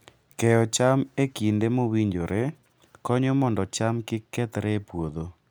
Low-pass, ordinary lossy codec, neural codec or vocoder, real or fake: none; none; none; real